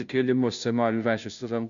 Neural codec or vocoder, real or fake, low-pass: codec, 16 kHz, 0.5 kbps, FunCodec, trained on Chinese and English, 25 frames a second; fake; 7.2 kHz